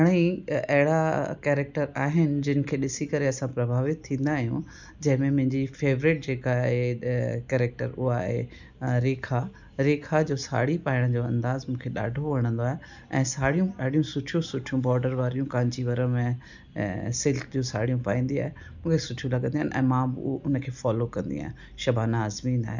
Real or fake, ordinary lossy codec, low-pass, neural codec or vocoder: real; none; 7.2 kHz; none